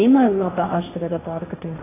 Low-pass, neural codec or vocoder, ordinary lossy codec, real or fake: 3.6 kHz; codec, 16 kHz, 1.1 kbps, Voila-Tokenizer; AAC, 16 kbps; fake